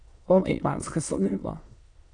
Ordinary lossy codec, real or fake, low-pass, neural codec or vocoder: AAC, 64 kbps; fake; 9.9 kHz; autoencoder, 22.05 kHz, a latent of 192 numbers a frame, VITS, trained on many speakers